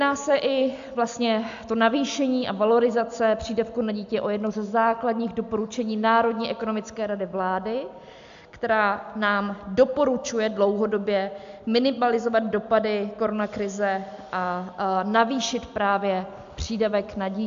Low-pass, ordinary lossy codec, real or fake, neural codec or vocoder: 7.2 kHz; AAC, 64 kbps; real; none